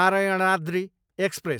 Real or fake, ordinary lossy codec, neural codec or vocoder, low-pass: real; none; none; none